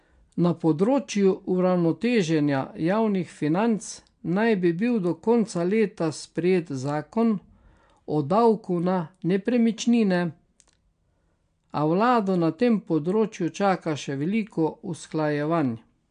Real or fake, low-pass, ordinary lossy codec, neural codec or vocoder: real; 9.9 kHz; MP3, 64 kbps; none